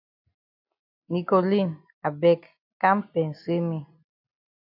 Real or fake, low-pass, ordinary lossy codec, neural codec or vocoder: real; 5.4 kHz; AAC, 48 kbps; none